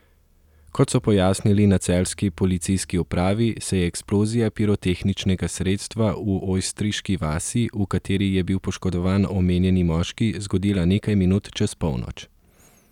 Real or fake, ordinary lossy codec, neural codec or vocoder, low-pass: real; none; none; 19.8 kHz